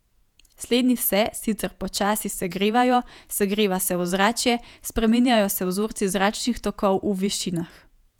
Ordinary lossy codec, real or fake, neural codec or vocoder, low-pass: none; fake; vocoder, 48 kHz, 128 mel bands, Vocos; 19.8 kHz